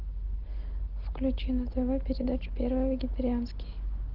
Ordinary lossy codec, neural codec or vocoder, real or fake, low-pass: Opus, 16 kbps; none; real; 5.4 kHz